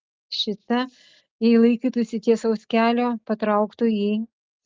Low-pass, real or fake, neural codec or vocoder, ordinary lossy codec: 7.2 kHz; real; none; Opus, 32 kbps